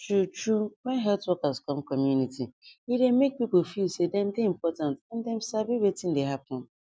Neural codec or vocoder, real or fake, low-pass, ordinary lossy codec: none; real; none; none